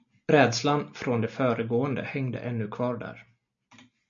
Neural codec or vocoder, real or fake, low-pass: none; real; 7.2 kHz